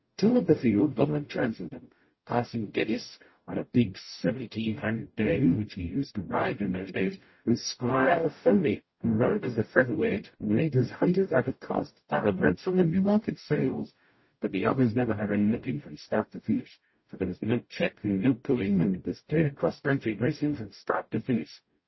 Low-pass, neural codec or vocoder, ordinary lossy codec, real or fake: 7.2 kHz; codec, 44.1 kHz, 0.9 kbps, DAC; MP3, 24 kbps; fake